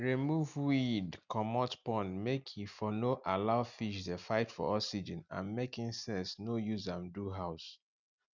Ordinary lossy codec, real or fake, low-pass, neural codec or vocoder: none; real; 7.2 kHz; none